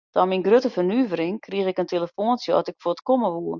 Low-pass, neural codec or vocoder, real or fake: 7.2 kHz; none; real